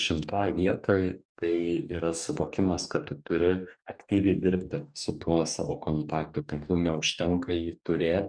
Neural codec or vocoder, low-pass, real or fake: codec, 44.1 kHz, 2.6 kbps, DAC; 9.9 kHz; fake